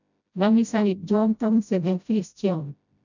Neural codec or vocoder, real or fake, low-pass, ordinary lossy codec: codec, 16 kHz, 0.5 kbps, FreqCodec, smaller model; fake; 7.2 kHz; none